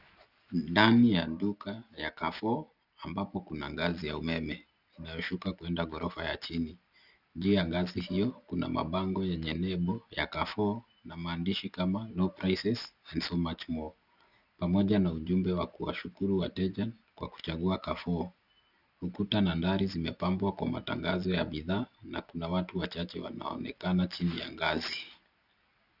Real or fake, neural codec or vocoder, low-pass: real; none; 5.4 kHz